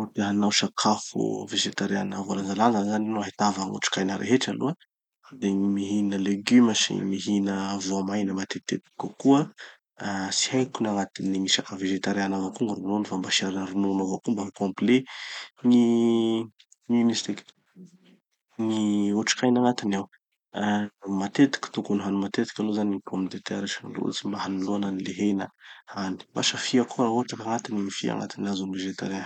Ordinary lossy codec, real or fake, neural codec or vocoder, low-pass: none; real; none; 19.8 kHz